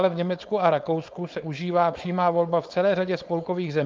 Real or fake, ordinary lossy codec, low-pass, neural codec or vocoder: fake; Opus, 24 kbps; 7.2 kHz; codec, 16 kHz, 4.8 kbps, FACodec